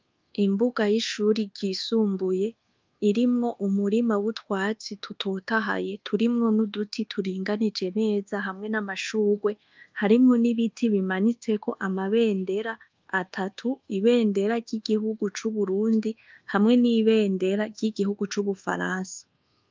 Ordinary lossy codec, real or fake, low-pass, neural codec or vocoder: Opus, 24 kbps; fake; 7.2 kHz; codec, 24 kHz, 1.2 kbps, DualCodec